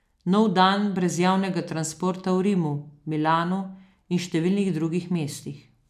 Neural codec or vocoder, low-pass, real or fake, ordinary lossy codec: none; 14.4 kHz; real; none